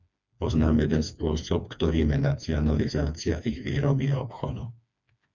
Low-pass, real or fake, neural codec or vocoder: 7.2 kHz; fake; codec, 16 kHz, 2 kbps, FreqCodec, smaller model